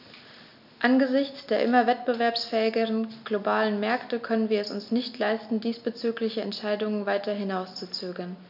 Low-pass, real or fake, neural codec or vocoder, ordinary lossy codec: 5.4 kHz; real; none; none